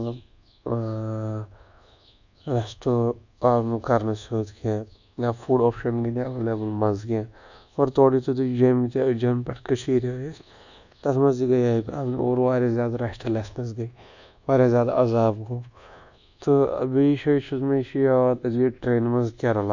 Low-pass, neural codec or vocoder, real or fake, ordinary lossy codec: 7.2 kHz; codec, 24 kHz, 1.2 kbps, DualCodec; fake; none